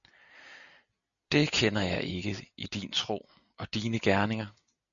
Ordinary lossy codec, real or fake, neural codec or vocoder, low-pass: MP3, 96 kbps; real; none; 7.2 kHz